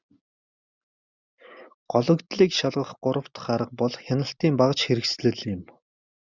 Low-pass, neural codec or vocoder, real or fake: 7.2 kHz; none; real